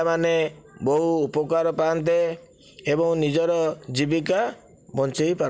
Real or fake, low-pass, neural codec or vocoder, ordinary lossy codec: real; none; none; none